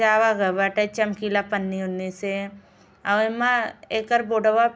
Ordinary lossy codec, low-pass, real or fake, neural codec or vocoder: none; none; real; none